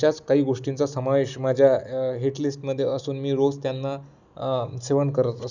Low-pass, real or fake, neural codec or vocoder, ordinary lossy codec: 7.2 kHz; real; none; none